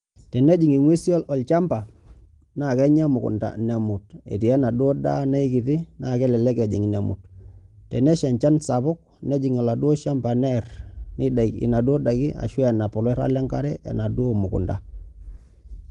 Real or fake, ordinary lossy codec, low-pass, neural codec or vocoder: real; Opus, 24 kbps; 10.8 kHz; none